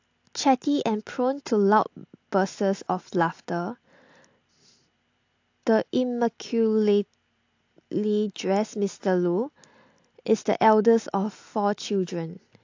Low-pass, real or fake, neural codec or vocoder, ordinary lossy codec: 7.2 kHz; real; none; AAC, 48 kbps